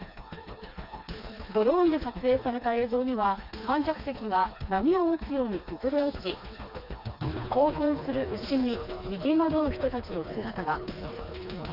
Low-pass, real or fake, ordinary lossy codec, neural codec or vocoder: 5.4 kHz; fake; none; codec, 16 kHz, 2 kbps, FreqCodec, smaller model